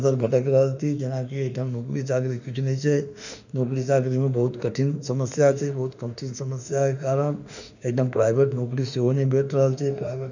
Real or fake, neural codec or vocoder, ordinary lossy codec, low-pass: fake; autoencoder, 48 kHz, 32 numbers a frame, DAC-VAE, trained on Japanese speech; none; 7.2 kHz